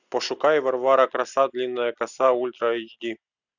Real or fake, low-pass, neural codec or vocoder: real; 7.2 kHz; none